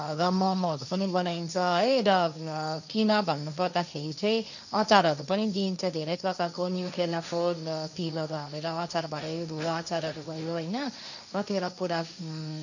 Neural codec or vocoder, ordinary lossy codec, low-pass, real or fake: codec, 16 kHz, 1.1 kbps, Voila-Tokenizer; none; 7.2 kHz; fake